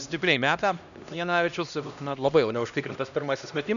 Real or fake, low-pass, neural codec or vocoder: fake; 7.2 kHz; codec, 16 kHz, 1 kbps, X-Codec, HuBERT features, trained on LibriSpeech